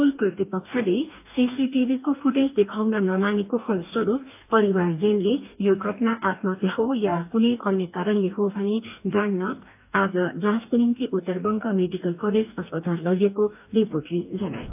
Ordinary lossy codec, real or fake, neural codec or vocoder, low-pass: AAC, 32 kbps; fake; codec, 44.1 kHz, 2.6 kbps, DAC; 3.6 kHz